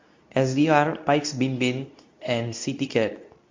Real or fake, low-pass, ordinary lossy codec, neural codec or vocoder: fake; 7.2 kHz; MP3, 48 kbps; codec, 24 kHz, 0.9 kbps, WavTokenizer, medium speech release version 2